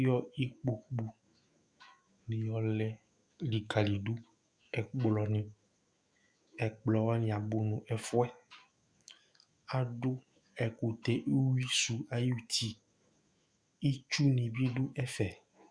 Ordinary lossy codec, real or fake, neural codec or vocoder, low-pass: Opus, 64 kbps; fake; autoencoder, 48 kHz, 128 numbers a frame, DAC-VAE, trained on Japanese speech; 9.9 kHz